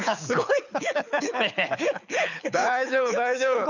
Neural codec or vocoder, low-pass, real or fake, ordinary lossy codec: codec, 24 kHz, 6 kbps, HILCodec; 7.2 kHz; fake; none